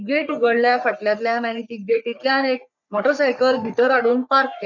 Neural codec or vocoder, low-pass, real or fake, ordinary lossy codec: codec, 44.1 kHz, 3.4 kbps, Pupu-Codec; 7.2 kHz; fake; none